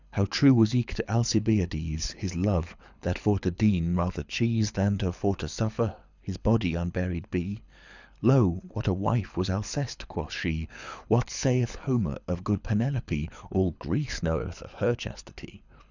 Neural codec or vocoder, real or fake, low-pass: codec, 24 kHz, 6 kbps, HILCodec; fake; 7.2 kHz